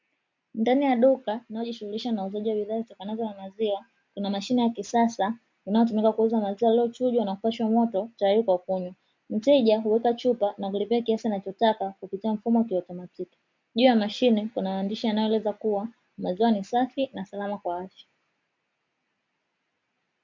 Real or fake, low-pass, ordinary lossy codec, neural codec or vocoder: real; 7.2 kHz; MP3, 64 kbps; none